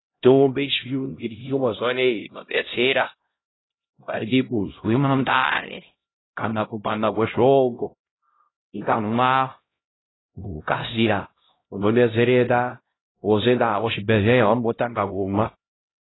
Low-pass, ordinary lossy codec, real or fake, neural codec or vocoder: 7.2 kHz; AAC, 16 kbps; fake; codec, 16 kHz, 0.5 kbps, X-Codec, HuBERT features, trained on LibriSpeech